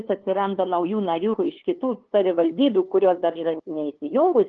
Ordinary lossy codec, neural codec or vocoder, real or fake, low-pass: Opus, 16 kbps; codec, 16 kHz, 2 kbps, FunCodec, trained on LibriTTS, 25 frames a second; fake; 7.2 kHz